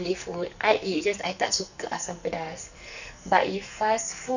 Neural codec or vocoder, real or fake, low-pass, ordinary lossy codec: codec, 44.1 kHz, 2.6 kbps, SNAC; fake; 7.2 kHz; none